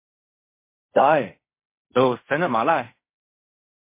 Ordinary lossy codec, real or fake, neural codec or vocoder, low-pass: MP3, 24 kbps; fake; codec, 16 kHz in and 24 kHz out, 0.4 kbps, LongCat-Audio-Codec, fine tuned four codebook decoder; 3.6 kHz